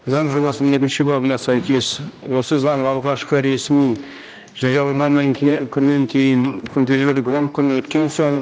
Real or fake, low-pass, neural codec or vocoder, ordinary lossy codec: fake; none; codec, 16 kHz, 1 kbps, X-Codec, HuBERT features, trained on general audio; none